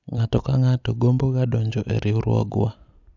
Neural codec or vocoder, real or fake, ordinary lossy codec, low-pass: none; real; none; 7.2 kHz